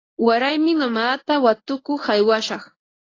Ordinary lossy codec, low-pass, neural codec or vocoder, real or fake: AAC, 32 kbps; 7.2 kHz; codec, 24 kHz, 0.9 kbps, WavTokenizer, medium speech release version 1; fake